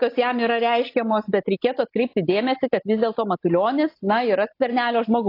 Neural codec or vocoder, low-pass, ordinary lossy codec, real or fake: none; 5.4 kHz; AAC, 32 kbps; real